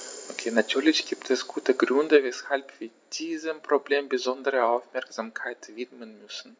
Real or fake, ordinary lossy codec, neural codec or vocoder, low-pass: real; none; none; none